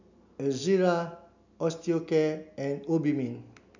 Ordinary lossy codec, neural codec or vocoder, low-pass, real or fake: none; none; 7.2 kHz; real